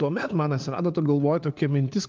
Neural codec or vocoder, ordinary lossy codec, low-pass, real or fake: codec, 16 kHz, 4 kbps, FunCodec, trained on LibriTTS, 50 frames a second; Opus, 24 kbps; 7.2 kHz; fake